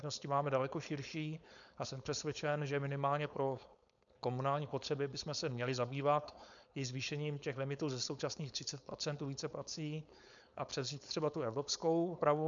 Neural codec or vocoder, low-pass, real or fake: codec, 16 kHz, 4.8 kbps, FACodec; 7.2 kHz; fake